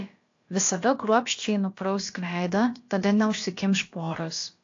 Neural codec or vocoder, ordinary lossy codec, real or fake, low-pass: codec, 16 kHz, about 1 kbps, DyCAST, with the encoder's durations; AAC, 48 kbps; fake; 7.2 kHz